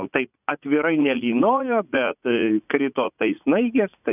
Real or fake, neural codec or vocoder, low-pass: fake; vocoder, 44.1 kHz, 80 mel bands, Vocos; 3.6 kHz